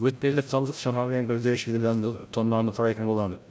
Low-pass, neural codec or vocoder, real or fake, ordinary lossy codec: none; codec, 16 kHz, 0.5 kbps, FreqCodec, larger model; fake; none